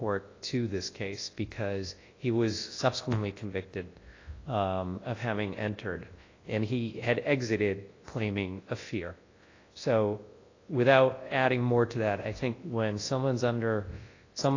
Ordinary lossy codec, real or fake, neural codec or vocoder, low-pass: AAC, 32 kbps; fake; codec, 24 kHz, 0.9 kbps, WavTokenizer, large speech release; 7.2 kHz